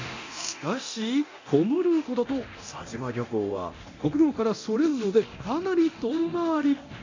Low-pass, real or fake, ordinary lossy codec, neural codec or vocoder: 7.2 kHz; fake; AAC, 48 kbps; codec, 24 kHz, 0.9 kbps, DualCodec